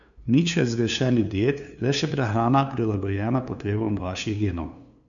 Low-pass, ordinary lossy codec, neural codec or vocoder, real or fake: 7.2 kHz; none; codec, 16 kHz, 2 kbps, FunCodec, trained on LibriTTS, 25 frames a second; fake